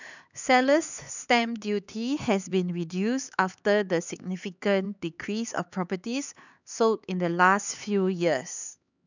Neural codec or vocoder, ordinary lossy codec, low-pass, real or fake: codec, 16 kHz, 4 kbps, X-Codec, HuBERT features, trained on LibriSpeech; none; 7.2 kHz; fake